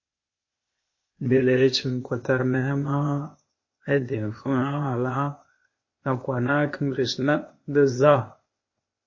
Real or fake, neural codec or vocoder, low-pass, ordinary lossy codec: fake; codec, 16 kHz, 0.8 kbps, ZipCodec; 7.2 kHz; MP3, 32 kbps